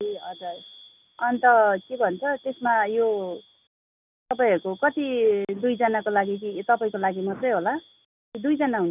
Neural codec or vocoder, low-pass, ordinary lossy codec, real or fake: none; 3.6 kHz; none; real